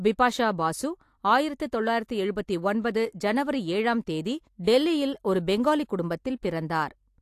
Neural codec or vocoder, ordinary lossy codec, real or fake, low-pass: none; AAC, 64 kbps; real; 14.4 kHz